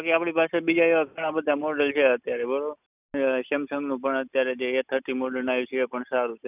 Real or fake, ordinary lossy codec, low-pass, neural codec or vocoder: real; none; 3.6 kHz; none